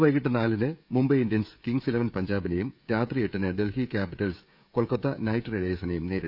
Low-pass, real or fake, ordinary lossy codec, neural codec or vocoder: 5.4 kHz; fake; none; codec, 16 kHz, 16 kbps, FreqCodec, smaller model